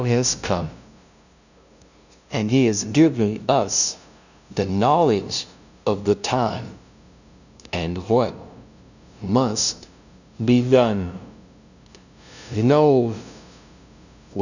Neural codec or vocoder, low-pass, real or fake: codec, 16 kHz, 0.5 kbps, FunCodec, trained on LibriTTS, 25 frames a second; 7.2 kHz; fake